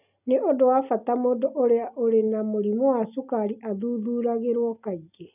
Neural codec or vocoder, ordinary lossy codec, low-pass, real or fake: none; none; 3.6 kHz; real